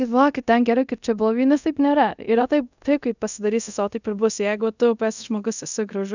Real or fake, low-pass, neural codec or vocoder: fake; 7.2 kHz; codec, 24 kHz, 0.5 kbps, DualCodec